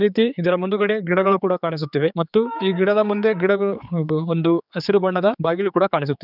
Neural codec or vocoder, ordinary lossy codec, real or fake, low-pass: codec, 16 kHz, 4 kbps, X-Codec, HuBERT features, trained on general audio; none; fake; 5.4 kHz